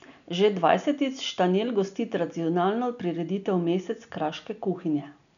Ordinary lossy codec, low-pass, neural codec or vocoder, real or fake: none; 7.2 kHz; none; real